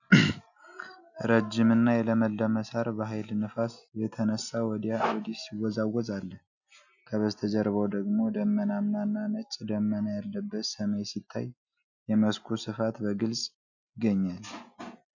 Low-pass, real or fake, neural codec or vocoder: 7.2 kHz; real; none